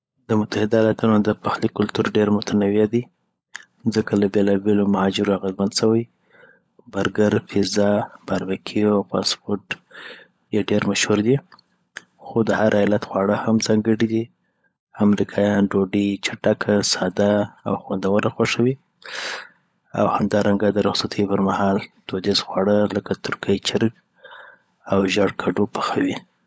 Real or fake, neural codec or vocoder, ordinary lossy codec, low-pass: fake; codec, 16 kHz, 16 kbps, FunCodec, trained on LibriTTS, 50 frames a second; none; none